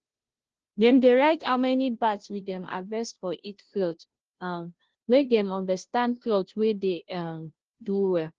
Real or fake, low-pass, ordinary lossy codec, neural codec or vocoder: fake; 7.2 kHz; Opus, 16 kbps; codec, 16 kHz, 0.5 kbps, FunCodec, trained on Chinese and English, 25 frames a second